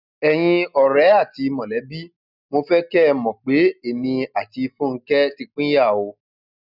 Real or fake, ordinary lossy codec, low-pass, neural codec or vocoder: real; none; 5.4 kHz; none